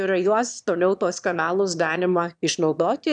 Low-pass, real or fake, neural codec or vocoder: 9.9 kHz; fake; autoencoder, 22.05 kHz, a latent of 192 numbers a frame, VITS, trained on one speaker